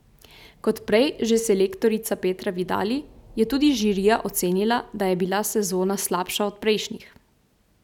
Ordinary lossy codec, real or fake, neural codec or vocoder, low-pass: none; real; none; 19.8 kHz